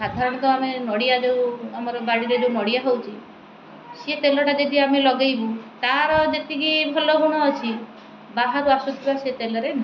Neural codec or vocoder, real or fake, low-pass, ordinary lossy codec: none; real; 7.2 kHz; none